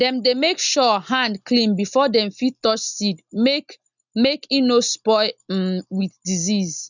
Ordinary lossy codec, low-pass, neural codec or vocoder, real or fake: none; 7.2 kHz; none; real